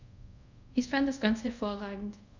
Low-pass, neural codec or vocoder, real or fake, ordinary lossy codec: 7.2 kHz; codec, 24 kHz, 0.5 kbps, DualCodec; fake; none